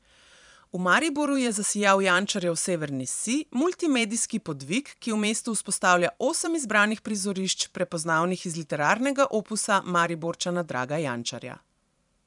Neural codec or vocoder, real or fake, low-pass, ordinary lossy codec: vocoder, 44.1 kHz, 128 mel bands every 512 samples, BigVGAN v2; fake; 10.8 kHz; none